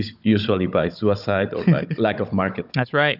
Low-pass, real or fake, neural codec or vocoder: 5.4 kHz; fake; codec, 16 kHz, 16 kbps, FunCodec, trained on Chinese and English, 50 frames a second